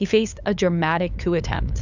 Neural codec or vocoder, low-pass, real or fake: codec, 16 kHz, 8 kbps, FunCodec, trained on LibriTTS, 25 frames a second; 7.2 kHz; fake